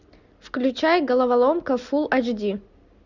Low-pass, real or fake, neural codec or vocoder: 7.2 kHz; real; none